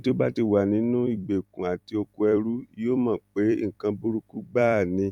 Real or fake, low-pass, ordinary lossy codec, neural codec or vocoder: fake; 19.8 kHz; none; vocoder, 44.1 kHz, 128 mel bands every 256 samples, BigVGAN v2